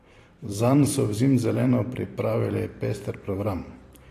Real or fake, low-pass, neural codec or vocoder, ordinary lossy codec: fake; 14.4 kHz; vocoder, 44.1 kHz, 128 mel bands every 512 samples, BigVGAN v2; AAC, 48 kbps